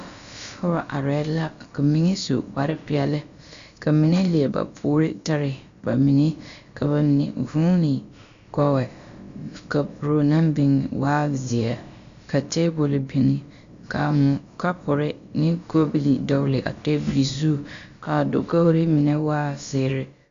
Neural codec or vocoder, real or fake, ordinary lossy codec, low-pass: codec, 16 kHz, about 1 kbps, DyCAST, with the encoder's durations; fake; Opus, 64 kbps; 7.2 kHz